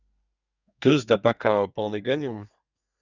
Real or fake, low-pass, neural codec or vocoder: fake; 7.2 kHz; codec, 32 kHz, 1.9 kbps, SNAC